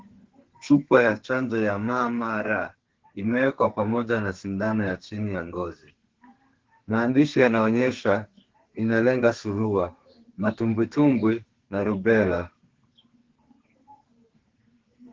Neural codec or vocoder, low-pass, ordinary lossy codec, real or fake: codec, 32 kHz, 1.9 kbps, SNAC; 7.2 kHz; Opus, 16 kbps; fake